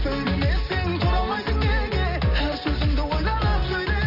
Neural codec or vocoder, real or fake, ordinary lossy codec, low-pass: none; real; AAC, 48 kbps; 5.4 kHz